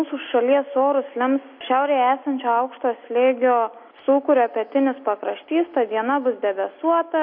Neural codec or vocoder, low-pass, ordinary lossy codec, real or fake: none; 5.4 kHz; MP3, 32 kbps; real